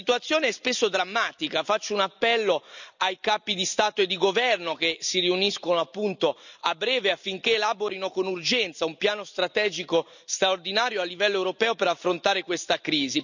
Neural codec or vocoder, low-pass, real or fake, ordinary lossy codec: none; 7.2 kHz; real; none